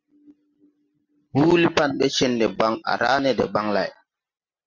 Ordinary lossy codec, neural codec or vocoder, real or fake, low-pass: MP3, 48 kbps; none; real; 7.2 kHz